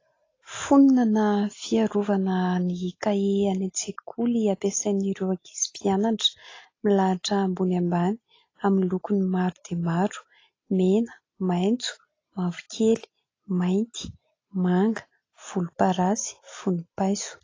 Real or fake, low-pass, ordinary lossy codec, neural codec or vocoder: real; 7.2 kHz; AAC, 32 kbps; none